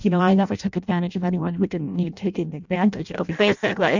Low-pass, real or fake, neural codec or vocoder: 7.2 kHz; fake; codec, 16 kHz in and 24 kHz out, 0.6 kbps, FireRedTTS-2 codec